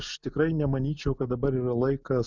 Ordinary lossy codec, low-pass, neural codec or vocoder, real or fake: Opus, 64 kbps; 7.2 kHz; none; real